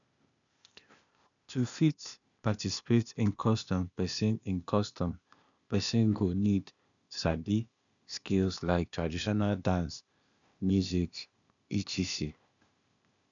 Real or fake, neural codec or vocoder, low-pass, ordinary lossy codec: fake; codec, 16 kHz, 0.8 kbps, ZipCodec; 7.2 kHz; none